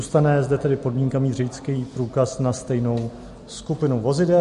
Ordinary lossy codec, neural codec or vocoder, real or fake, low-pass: MP3, 48 kbps; none; real; 14.4 kHz